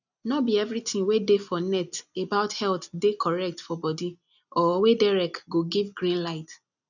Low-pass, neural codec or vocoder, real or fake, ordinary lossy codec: 7.2 kHz; none; real; none